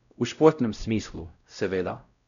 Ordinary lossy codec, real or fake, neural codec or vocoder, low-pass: none; fake; codec, 16 kHz, 0.5 kbps, X-Codec, WavLM features, trained on Multilingual LibriSpeech; 7.2 kHz